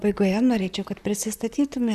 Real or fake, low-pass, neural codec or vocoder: fake; 14.4 kHz; vocoder, 44.1 kHz, 128 mel bands, Pupu-Vocoder